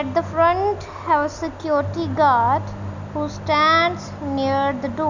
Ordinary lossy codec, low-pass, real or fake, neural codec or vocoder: none; 7.2 kHz; real; none